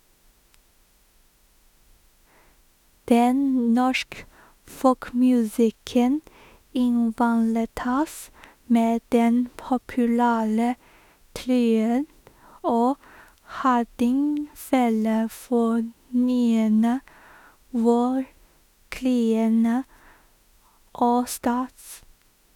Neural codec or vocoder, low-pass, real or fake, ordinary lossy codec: autoencoder, 48 kHz, 32 numbers a frame, DAC-VAE, trained on Japanese speech; 19.8 kHz; fake; none